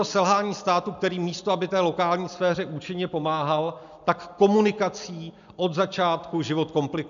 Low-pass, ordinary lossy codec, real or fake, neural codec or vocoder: 7.2 kHz; AAC, 96 kbps; real; none